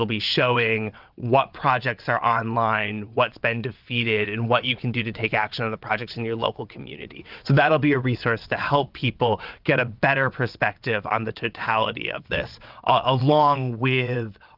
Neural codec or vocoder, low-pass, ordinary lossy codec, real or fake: vocoder, 22.05 kHz, 80 mel bands, WaveNeXt; 5.4 kHz; Opus, 24 kbps; fake